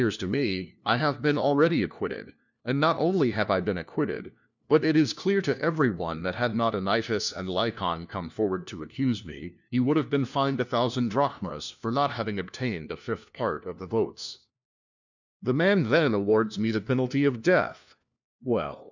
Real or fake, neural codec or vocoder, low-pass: fake; codec, 16 kHz, 1 kbps, FunCodec, trained on LibriTTS, 50 frames a second; 7.2 kHz